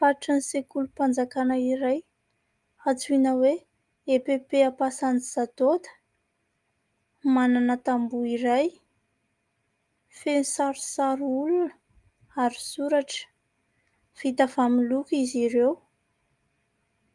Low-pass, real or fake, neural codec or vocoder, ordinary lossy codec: 10.8 kHz; real; none; Opus, 32 kbps